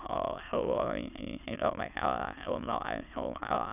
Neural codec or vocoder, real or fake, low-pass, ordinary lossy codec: autoencoder, 22.05 kHz, a latent of 192 numbers a frame, VITS, trained on many speakers; fake; 3.6 kHz; none